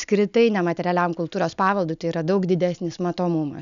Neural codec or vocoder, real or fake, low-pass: none; real; 7.2 kHz